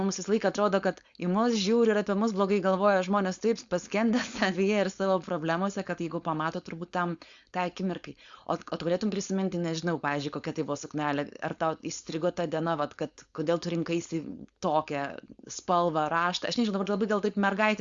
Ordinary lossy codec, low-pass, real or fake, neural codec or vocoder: Opus, 64 kbps; 7.2 kHz; fake; codec, 16 kHz, 4.8 kbps, FACodec